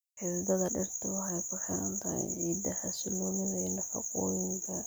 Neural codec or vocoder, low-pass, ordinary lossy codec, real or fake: none; none; none; real